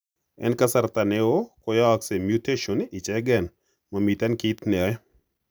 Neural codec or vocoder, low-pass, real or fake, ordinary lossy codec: none; none; real; none